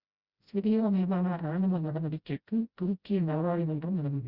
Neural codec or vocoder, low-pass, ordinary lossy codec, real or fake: codec, 16 kHz, 0.5 kbps, FreqCodec, smaller model; 5.4 kHz; Opus, 64 kbps; fake